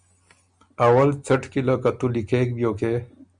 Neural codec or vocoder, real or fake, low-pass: none; real; 9.9 kHz